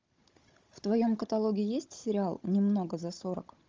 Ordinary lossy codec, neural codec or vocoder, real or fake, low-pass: Opus, 32 kbps; codec, 16 kHz, 16 kbps, FunCodec, trained on Chinese and English, 50 frames a second; fake; 7.2 kHz